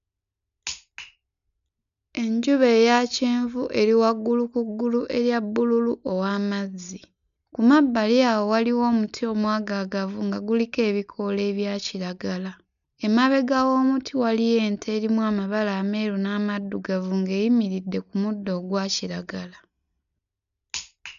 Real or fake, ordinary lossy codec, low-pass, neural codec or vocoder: real; none; 7.2 kHz; none